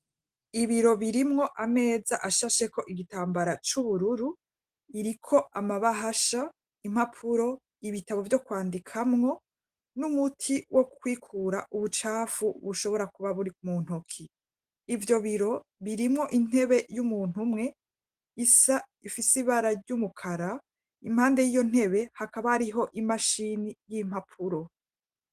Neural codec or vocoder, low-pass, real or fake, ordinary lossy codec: none; 14.4 kHz; real; Opus, 24 kbps